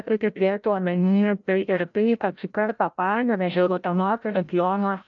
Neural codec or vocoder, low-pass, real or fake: codec, 16 kHz, 0.5 kbps, FreqCodec, larger model; 7.2 kHz; fake